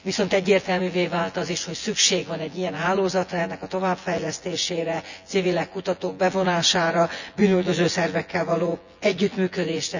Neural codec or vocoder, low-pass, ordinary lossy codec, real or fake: vocoder, 24 kHz, 100 mel bands, Vocos; 7.2 kHz; none; fake